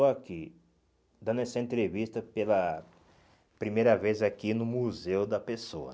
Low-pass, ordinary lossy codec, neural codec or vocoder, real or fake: none; none; none; real